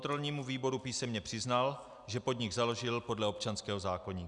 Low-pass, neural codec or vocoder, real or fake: 10.8 kHz; none; real